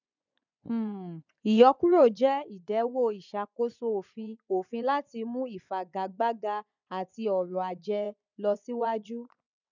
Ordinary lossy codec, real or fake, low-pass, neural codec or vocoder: none; fake; 7.2 kHz; vocoder, 24 kHz, 100 mel bands, Vocos